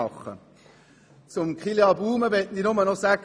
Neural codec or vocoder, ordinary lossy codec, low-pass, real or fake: none; none; none; real